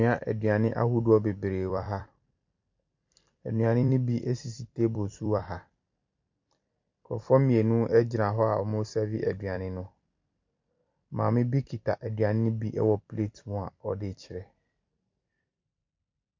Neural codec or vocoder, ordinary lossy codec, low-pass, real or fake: vocoder, 44.1 kHz, 128 mel bands every 256 samples, BigVGAN v2; MP3, 64 kbps; 7.2 kHz; fake